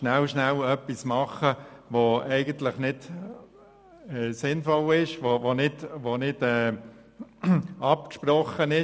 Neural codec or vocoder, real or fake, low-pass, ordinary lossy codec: none; real; none; none